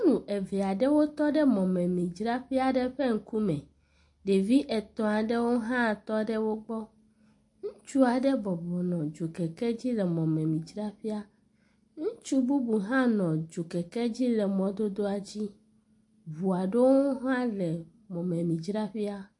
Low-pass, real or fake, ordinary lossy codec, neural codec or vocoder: 10.8 kHz; real; MP3, 48 kbps; none